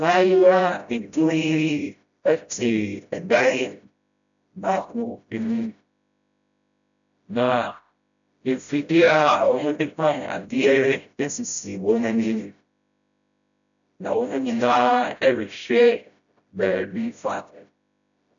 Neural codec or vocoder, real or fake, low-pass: codec, 16 kHz, 0.5 kbps, FreqCodec, smaller model; fake; 7.2 kHz